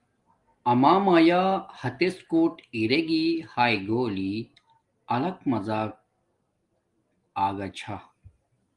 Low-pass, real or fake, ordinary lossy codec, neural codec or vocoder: 10.8 kHz; real; Opus, 24 kbps; none